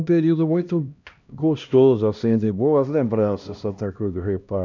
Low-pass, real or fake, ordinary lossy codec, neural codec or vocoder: 7.2 kHz; fake; none; codec, 16 kHz, 1 kbps, X-Codec, HuBERT features, trained on LibriSpeech